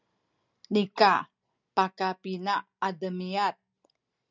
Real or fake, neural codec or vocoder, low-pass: real; none; 7.2 kHz